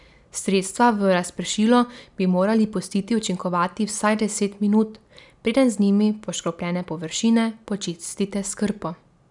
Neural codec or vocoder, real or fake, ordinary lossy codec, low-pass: none; real; none; 10.8 kHz